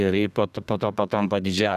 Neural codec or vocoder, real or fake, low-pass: codec, 32 kHz, 1.9 kbps, SNAC; fake; 14.4 kHz